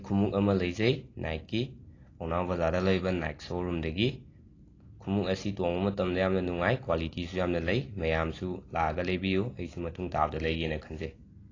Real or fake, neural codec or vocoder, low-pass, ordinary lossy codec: real; none; 7.2 kHz; AAC, 32 kbps